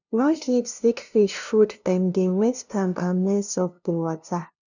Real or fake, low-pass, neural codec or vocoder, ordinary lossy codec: fake; 7.2 kHz; codec, 16 kHz, 0.5 kbps, FunCodec, trained on LibriTTS, 25 frames a second; none